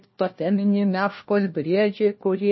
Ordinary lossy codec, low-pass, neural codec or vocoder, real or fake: MP3, 24 kbps; 7.2 kHz; codec, 16 kHz, 1 kbps, FunCodec, trained on LibriTTS, 50 frames a second; fake